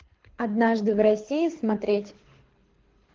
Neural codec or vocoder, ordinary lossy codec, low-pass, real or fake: codec, 24 kHz, 6 kbps, HILCodec; Opus, 16 kbps; 7.2 kHz; fake